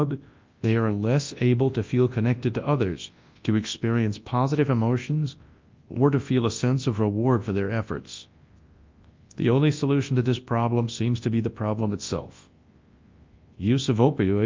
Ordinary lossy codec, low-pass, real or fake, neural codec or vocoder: Opus, 24 kbps; 7.2 kHz; fake; codec, 24 kHz, 0.9 kbps, WavTokenizer, large speech release